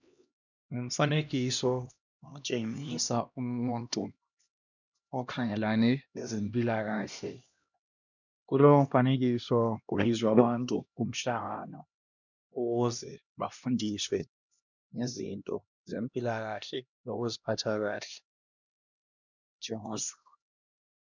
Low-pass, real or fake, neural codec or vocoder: 7.2 kHz; fake; codec, 16 kHz, 1 kbps, X-Codec, HuBERT features, trained on LibriSpeech